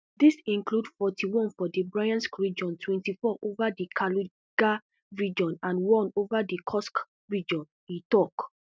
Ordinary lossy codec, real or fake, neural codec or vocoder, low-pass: none; real; none; none